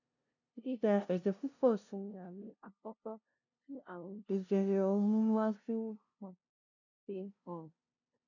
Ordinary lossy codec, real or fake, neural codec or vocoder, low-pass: none; fake; codec, 16 kHz, 0.5 kbps, FunCodec, trained on LibriTTS, 25 frames a second; 7.2 kHz